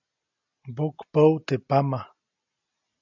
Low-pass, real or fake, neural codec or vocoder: 7.2 kHz; real; none